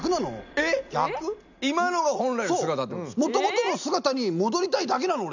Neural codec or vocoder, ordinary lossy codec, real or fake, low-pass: none; none; real; 7.2 kHz